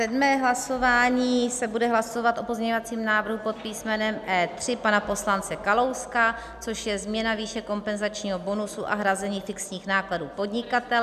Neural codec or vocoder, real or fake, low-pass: none; real; 14.4 kHz